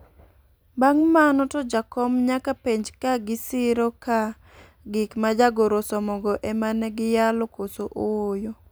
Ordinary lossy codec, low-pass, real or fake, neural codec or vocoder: none; none; real; none